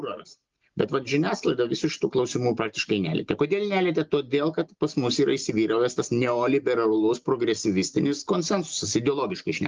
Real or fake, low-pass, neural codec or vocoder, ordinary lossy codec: real; 7.2 kHz; none; Opus, 32 kbps